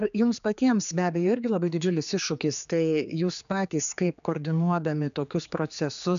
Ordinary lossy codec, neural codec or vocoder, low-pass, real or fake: MP3, 96 kbps; codec, 16 kHz, 4 kbps, X-Codec, HuBERT features, trained on general audio; 7.2 kHz; fake